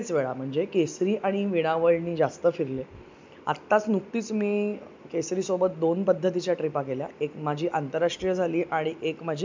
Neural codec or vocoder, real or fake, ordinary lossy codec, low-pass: none; real; MP3, 64 kbps; 7.2 kHz